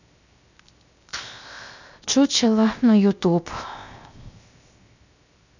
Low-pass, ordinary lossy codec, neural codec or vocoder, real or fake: 7.2 kHz; none; codec, 16 kHz, 0.7 kbps, FocalCodec; fake